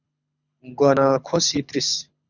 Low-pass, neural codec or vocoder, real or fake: 7.2 kHz; codec, 24 kHz, 6 kbps, HILCodec; fake